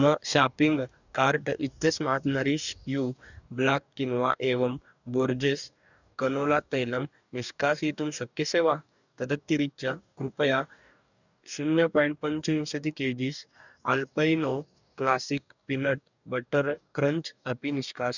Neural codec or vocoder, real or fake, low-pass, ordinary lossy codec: codec, 44.1 kHz, 2.6 kbps, DAC; fake; 7.2 kHz; none